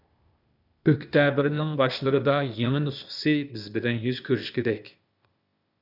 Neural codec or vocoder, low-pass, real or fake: codec, 16 kHz, 0.8 kbps, ZipCodec; 5.4 kHz; fake